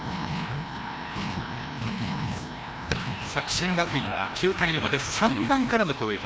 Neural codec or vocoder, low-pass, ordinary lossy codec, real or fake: codec, 16 kHz, 1 kbps, FreqCodec, larger model; none; none; fake